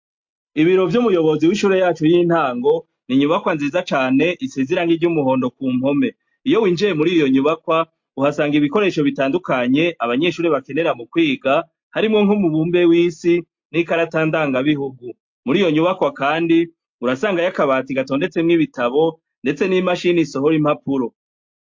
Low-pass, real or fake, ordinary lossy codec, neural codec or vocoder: 7.2 kHz; real; MP3, 48 kbps; none